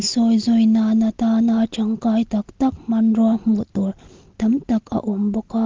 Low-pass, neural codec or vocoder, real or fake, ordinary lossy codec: 7.2 kHz; none; real; Opus, 16 kbps